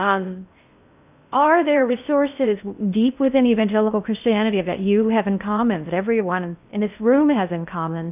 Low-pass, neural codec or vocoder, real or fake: 3.6 kHz; codec, 16 kHz in and 24 kHz out, 0.6 kbps, FocalCodec, streaming, 4096 codes; fake